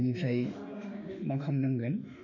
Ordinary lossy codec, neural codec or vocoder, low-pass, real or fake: none; autoencoder, 48 kHz, 32 numbers a frame, DAC-VAE, trained on Japanese speech; 7.2 kHz; fake